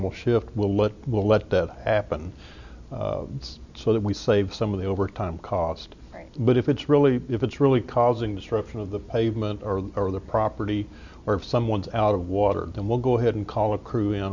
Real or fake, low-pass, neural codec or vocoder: real; 7.2 kHz; none